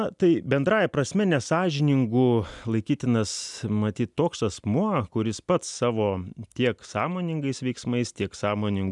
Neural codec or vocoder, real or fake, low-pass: none; real; 10.8 kHz